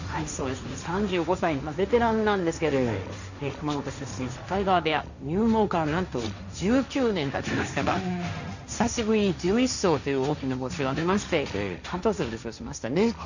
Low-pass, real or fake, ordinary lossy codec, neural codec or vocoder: 7.2 kHz; fake; none; codec, 16 kHz, 1.1 kbps, Voila-Tokenizer